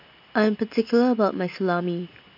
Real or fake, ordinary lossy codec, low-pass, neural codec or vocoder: real; MP3, 48 kbps; 5.4 kHz; none